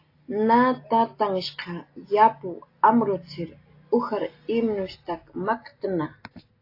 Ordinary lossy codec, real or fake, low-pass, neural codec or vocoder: AAC, 32 kbps; real; 5.4 kHz; none